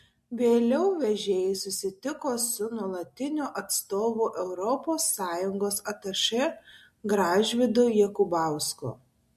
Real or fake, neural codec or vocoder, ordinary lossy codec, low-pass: real; none; MP3, 64 kbps; 14.4 kHz